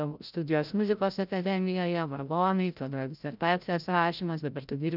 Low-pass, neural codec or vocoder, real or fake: 5.4 kHz; codec, 16 kHz, 0.5 kbps, FreqCodec, larger model; fake